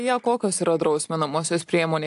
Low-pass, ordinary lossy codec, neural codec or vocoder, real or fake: 10.8 kHz; AAC, 64 kbps; none; real